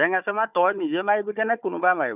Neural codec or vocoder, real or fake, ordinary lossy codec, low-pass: codec, 16 kHz, 4 kbps, FunCodec, trained on Chinese and English, 50 frames a second; fake; none; 3.6 kHz